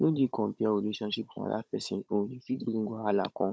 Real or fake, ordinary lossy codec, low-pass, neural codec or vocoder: fake; none; none; codec, 16 kHz, 16 kbps, FunCodec, trained on Chinese and English, 50 frames a second